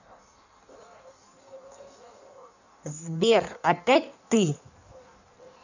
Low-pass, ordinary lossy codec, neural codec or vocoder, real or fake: 7.2 kHz; none; codec, 16 kHz in and 24 kHz out, 1.1 kbps, FireRedTTS-2 codec; fake